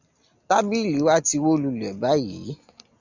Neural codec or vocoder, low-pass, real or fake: none; 7.2 kHz; real